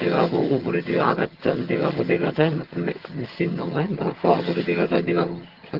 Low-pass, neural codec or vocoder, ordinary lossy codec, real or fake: 5.4 kHz; vocoder, 22.05 kHz, 80 mel bands, HiFi-GAN; Opus, 16 kbps; fake